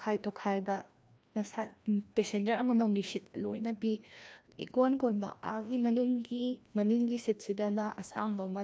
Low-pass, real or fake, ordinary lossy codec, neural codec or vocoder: none; fake; none; codec, 16 kHz, 1 kbps, FreqCodec, larger model